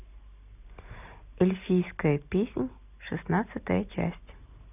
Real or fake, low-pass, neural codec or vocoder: real; 3.6 kHz; none